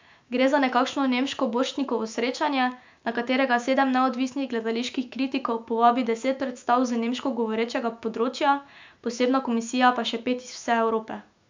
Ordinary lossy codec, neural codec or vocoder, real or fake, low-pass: MP3, 64 kbps; autoencoder, 48 kHz, 128 numbers a frame, DAC-VAE, trained on Japanese speech; fake; 7.2 kHz